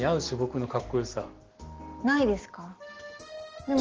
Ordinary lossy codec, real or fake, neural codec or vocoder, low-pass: Opus, 16 kbps; real; none; 7.2 kHz